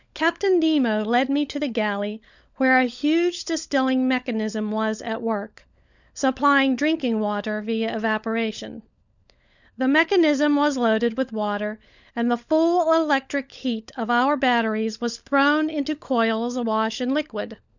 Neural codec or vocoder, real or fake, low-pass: codec, 16 kHz, 16 kbps, FunCodec, trained on LibriTTS, 50 frames a second; fake; 7.2 kHz